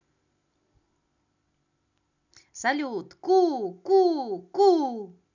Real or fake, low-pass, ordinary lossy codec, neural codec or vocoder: real; 7.2 kHz; none; none